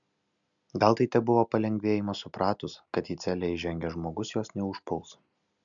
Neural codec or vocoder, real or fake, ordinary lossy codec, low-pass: none; real; MP3, 96 kbps; 7.2 kHz